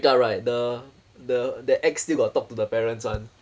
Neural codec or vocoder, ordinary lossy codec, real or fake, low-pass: none; none; real; none